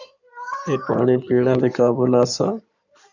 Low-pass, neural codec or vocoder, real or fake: 7.2 kHz; codec, 16 kHz in and 24 kHz out, 2.2 kbps, FireRedTTS-2 codec; fake